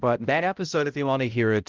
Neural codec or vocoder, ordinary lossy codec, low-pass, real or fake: codec, 16 kHz, 0.5 kbps, X-Codec, HuBERT features, trained on balanced general audio; Opus, 24 kbps; 7.2 kHz; fake